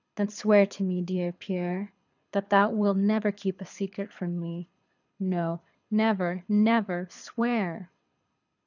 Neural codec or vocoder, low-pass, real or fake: codec, 24 kHz, 6 kbps, HILCodec; 7.2 kHz; fake